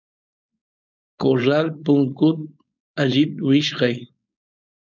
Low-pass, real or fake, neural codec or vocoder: 7.2 kHz; fake; codec, 16 kHz, 4.8 kbps, FACodec